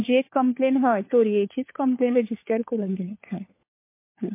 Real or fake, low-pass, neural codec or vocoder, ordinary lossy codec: fake; 3.6 kHz; codec, 16 kHz, 4 kbps, FunCodec, trained on LibriTTS, 50 frames a second; MP3, 24 kbps